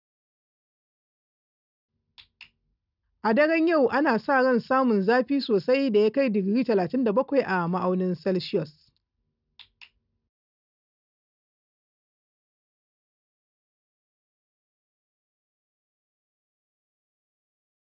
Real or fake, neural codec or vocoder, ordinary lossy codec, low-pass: real; none; none; 5.4 kHz